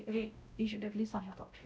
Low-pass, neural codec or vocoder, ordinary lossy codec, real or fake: none; codec, 16 kHz, 0.5 kbps, X-Codec, WavLM features, trained on Multilingual LibriSpeech; none; fake